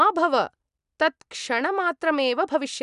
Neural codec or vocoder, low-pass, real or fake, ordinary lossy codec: none; 10.8 kHz; real; none